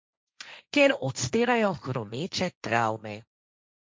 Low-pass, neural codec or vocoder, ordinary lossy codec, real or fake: none; codec, 16 kHz, 1.1 kbps, Voila-Tokenizer; none; fake